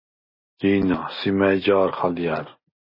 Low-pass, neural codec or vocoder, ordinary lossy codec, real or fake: 5.4 kHz; none; MP3, 24 kbps; real